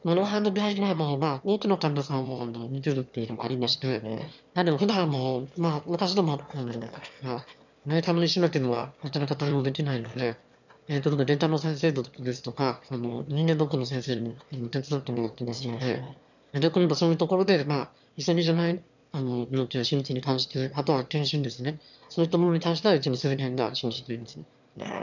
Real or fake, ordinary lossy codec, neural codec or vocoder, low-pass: fake; none; autoencoder, 22.05 kHz, a latent of 192 numbers a frame, VITS, trained on one speaker; 7.2 kHz